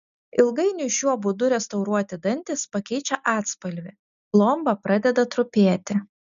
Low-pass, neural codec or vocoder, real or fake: 7.2 kHz; none; real